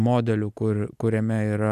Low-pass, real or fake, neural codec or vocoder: 14.4 kHz; real; none